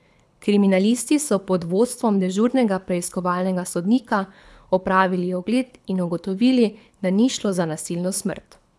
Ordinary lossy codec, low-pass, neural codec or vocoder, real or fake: none; none; codec, 24 kHz, 6 kbps, HILCodec; fake